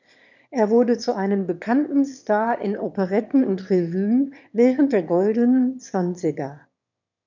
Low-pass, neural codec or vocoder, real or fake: 7.2 kHz; autoencoder, 22.05 kHz, a latent of 192 numbers a frame, VITS, trained on one speaker; fake